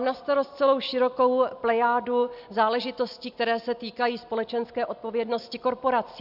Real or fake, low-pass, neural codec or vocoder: real; 5.4 kHz; none